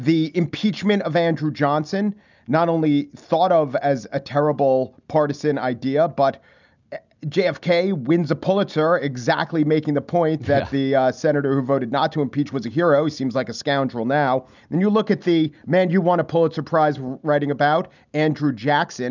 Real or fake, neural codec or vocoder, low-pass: real; none; 7.2 kHz